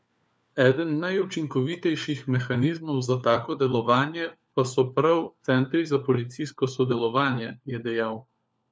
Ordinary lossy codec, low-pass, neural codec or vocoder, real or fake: none; none; codec, 16 kHz, 4 kbps, FunCodec, trained on LibriTTS, 50 frames a second; fake